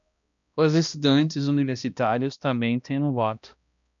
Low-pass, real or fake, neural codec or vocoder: 7.2 kHz; fake; codec, 16 kHz, 1 kbps, X-Codec, HuBERT features, trained on balanced general audio